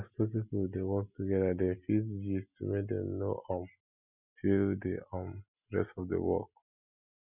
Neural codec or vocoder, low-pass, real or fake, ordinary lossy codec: none; 3.6 kHz; real; none